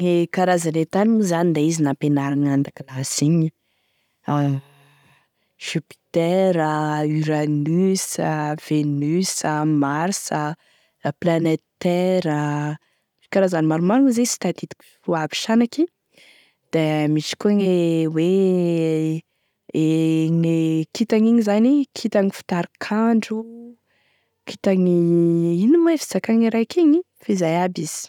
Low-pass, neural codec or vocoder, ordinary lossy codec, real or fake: 19.8 kHz; none; none; real